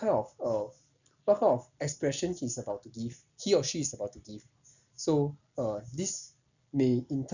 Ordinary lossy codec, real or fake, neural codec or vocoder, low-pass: none; real; none; 7.2 kHz